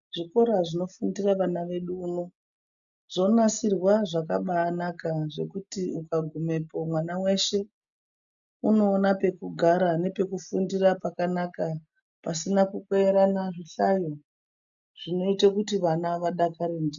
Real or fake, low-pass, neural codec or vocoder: real; 7.2 kHz; none